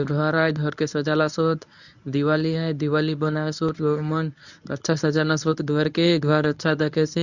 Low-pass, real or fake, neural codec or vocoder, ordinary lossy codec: 7.2 kHz; fake; codec, 24 kHz, 0.9 kbps, WavTokenizer, medium speech release version 1; none